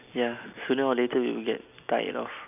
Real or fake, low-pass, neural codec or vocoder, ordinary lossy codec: real; 3.6 kHz; none; none